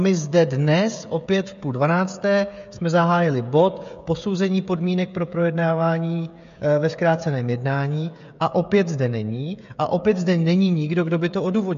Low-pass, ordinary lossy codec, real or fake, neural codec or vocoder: 7.2 kHz; MP3, 48 kbps; fake; codec, 16 kHz, 16 kbps, FreqCodec, smaller model